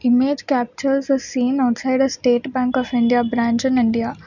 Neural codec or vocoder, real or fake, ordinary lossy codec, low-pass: none; real; none; 7.2 kHz